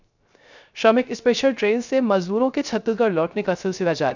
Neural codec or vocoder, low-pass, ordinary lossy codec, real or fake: codec, 16 kHz, 0.3 kbps, FocalCodec; 7.2 kHz; none; fake